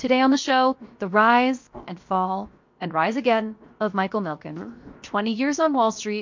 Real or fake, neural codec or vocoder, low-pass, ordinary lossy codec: fake; codec, 16 kHz, 0.7 kbps, FocalCodec; 7.2 kHz; MP3, 48 kbps